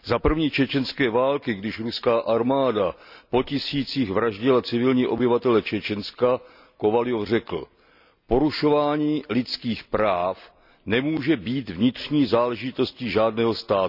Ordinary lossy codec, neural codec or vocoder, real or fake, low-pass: none; none; real; 5.4 kHz